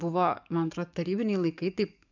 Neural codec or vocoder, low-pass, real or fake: codec, 44.1 kHz, 7.8 kbps, DAC; 7.2 kHz; fake